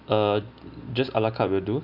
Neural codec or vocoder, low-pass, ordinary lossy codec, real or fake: none; 5.4 kHz; none; real